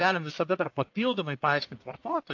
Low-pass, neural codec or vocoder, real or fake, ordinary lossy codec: 7.2 kHz; codec, 44.1 kHz, 1.7 kbps, Pupu-Codec; fake; AAC, 48 kbps